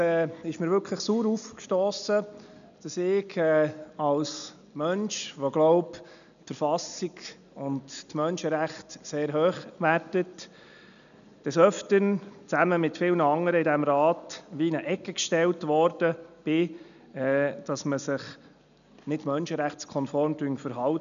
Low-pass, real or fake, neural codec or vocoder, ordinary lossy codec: 7.2 kHz; real; none; none